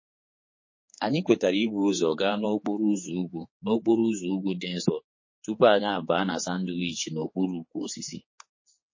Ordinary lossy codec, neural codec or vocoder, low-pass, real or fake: MP3, 32 kbps; codec, 16 kHz, 4 kbps, X-Codec, HuBERT features, trained on general audio; 7.2 kHz; fake